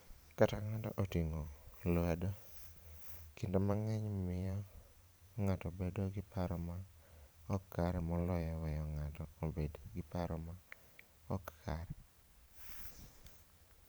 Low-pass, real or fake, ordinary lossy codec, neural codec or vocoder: none; fake; none; vocoder, 44.1 kHz, 128 mel bands every 512 samples, BigVGAN v2